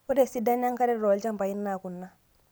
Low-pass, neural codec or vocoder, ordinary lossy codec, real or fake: none; none; none; real